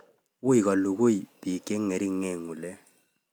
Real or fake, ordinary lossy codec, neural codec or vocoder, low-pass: real; none; none; none